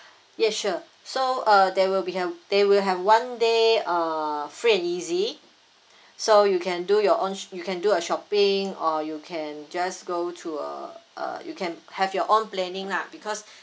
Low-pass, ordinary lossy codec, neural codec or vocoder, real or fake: none; none; none; real